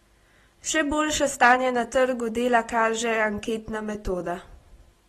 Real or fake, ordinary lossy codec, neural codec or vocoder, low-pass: real; AAC, 32 kbps; none; 19.8 kHz